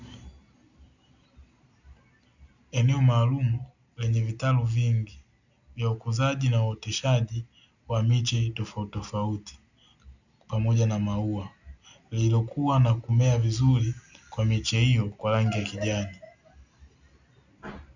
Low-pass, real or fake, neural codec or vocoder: 7.2 kHz; real; none